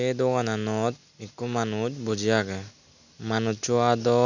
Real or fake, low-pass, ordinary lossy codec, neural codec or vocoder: real; 7.2 kHz; none; none